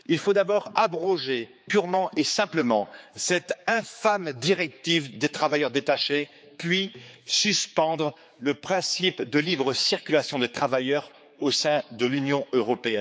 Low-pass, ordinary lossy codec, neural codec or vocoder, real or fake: none; none; codec, 16 kHz, 4 kbps, X-Codec, HuBERT features, trained on general audio; fake